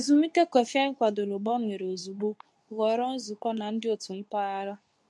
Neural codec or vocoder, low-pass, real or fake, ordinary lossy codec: codec, 24 kHz, 0.9 kbps, WavTokenizer, medium speech release version 2; none; fake; none